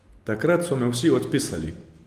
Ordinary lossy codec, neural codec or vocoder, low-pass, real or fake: Opus, 24 kbps; none; 14.4 kHz; real